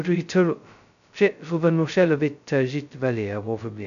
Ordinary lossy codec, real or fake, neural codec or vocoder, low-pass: none; fake; codec, 16 kHz, 0.2 kbps, FocalCodec; 7.2 kHz